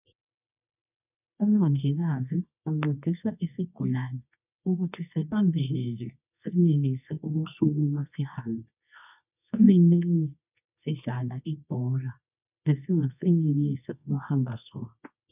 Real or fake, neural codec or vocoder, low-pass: fake; codec, 24 kHz, 0.9 kbps, WavTokenizer, medium music audio release; 3.6 kHz